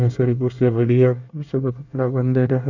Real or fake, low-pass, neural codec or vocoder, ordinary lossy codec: fake; 7.2 kHz; codec, 24 kHz, 1 kbps, SNAC; none